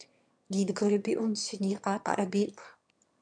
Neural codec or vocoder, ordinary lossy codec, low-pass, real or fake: autoencoder, 22.05 kHz, a latent of 192 numbers a frame, VITS, trained on one speaker; MP3, 64 kbps; 9.9 kHz; fake